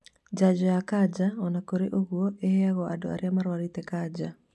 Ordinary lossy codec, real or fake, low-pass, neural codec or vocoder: none; real; none; none